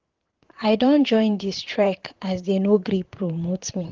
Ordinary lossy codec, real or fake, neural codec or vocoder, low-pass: Opus, 16 kbps; fake; vocoder, 44.1 kHz, 128 mel bands, Pupu-Vocoder; 7.2 kHz